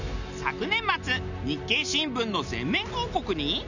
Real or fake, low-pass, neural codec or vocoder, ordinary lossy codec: real; 7.2 kHz; none; none